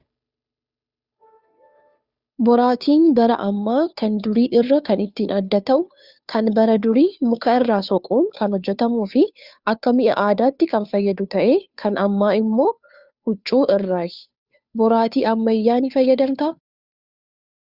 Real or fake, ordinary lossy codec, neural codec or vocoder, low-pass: fake; Opus, 64 kbps; codec, 16 kHz, 2 kbps, FunCodec, trained on Chinese and English, 25 frames a second; 5.4 kHz